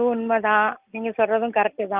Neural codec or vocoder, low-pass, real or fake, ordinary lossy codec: none; 3.6 kHz; real; Opus, 24 kbps